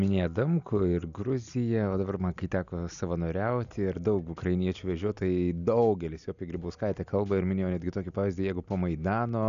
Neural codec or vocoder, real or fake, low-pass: none; real; 7.2 kHz